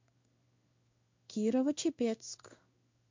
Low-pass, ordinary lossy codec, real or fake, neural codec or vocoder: 7.2 kHz; MP3, 48 kbps; fake; codec, 16 kHz in and 24 kHz out, 1 kbps, XY-Tokenizer